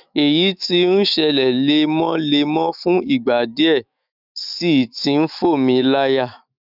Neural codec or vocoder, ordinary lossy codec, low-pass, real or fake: autoencoder, 48 kHz, 128 numbers a frame, DAC-VAE, trained on Japanese speech; none; 5.4 kHz; fake